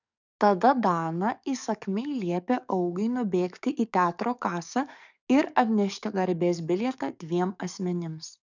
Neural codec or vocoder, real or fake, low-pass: codec, 44.1 kHz, 7.8 kbps, DAC; fake; 7.2 kHz